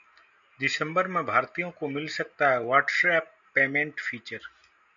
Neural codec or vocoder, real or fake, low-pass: none; real; 7.2 kHz